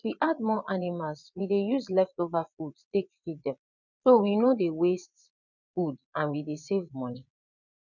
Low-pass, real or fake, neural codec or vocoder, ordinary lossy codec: 7.2 kHz; fake; vocoder, 22.05 kHz, 80 mel bands, Vocos; none